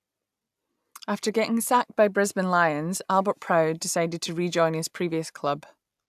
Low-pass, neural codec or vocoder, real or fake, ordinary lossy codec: 14.4 kHz; none; real; AAC, 96 kbps